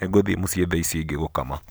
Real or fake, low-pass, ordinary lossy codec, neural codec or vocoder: real; none; none; none